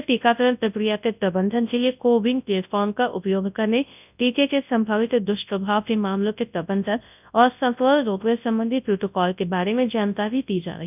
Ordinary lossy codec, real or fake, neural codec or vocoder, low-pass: none; fake; codec, 24 kHz, 0.9 kbps, WavTokenizer, large speech release; 3.6 kHz